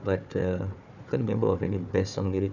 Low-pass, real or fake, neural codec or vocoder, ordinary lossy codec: 7.2 kHz; fake; codec, 16 kHz, 4 kbps, FunCodec, trained on Chinese and English, 50 frames a second; none